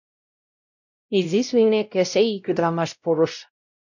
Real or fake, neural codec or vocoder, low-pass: fake; codec, 16 kHz, 0.5 kbps, X-Codec, WavLM features, trained on Multilingual LibriSpeech; 7.2 kHz